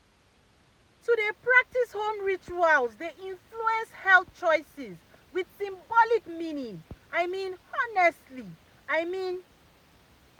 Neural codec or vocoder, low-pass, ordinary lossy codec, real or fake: none; 19.8 kHz; MP3, 96 kbps; real